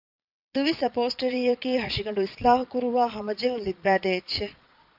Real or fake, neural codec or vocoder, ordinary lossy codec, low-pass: fake; vocoder, 22.05 kHz, 80 mel bands, Vocos; AAC, 48 kbps; 5.4 kHz